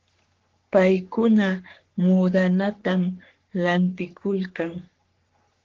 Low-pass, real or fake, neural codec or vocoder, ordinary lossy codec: 7.2 kHz; fake; codec, 44.1 kHz, 3.4 kbps, Pupu-Codec; Opus, 16 kbps